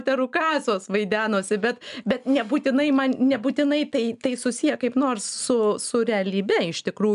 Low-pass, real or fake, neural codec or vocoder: 10.8 kHz; real; none